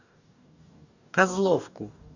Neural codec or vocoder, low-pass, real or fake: codec, 44.1 kHz, 2.6 kbps, DAC; 7.2 kHz; fake